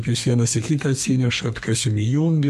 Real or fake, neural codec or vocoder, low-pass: fake; codec, 32 kHz, 1.9 kbps, SNAC; 14.4 kHz